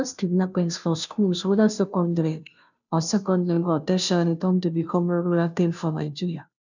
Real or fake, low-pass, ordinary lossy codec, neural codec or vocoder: fake; 7.2 kHz; none; codec, 16 kHz, 0.5 kbps, FunCodec, trained on Chinese and English, 25 frames a second